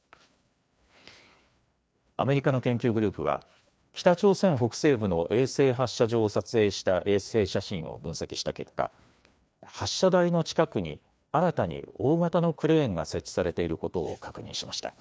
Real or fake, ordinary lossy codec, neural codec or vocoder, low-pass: fake; none; codec, 16 kHz, 2 kbps, FreqCodec, larger model; none